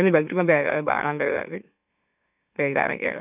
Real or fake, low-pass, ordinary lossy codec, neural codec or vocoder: fake; 3.6 kHz; none; autoencoder, 44.1 kHz, a latent of 192 numbers a frame, MeloTTS